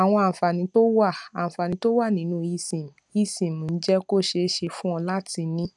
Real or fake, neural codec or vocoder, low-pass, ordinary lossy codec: real; none; 10.8 kHz; none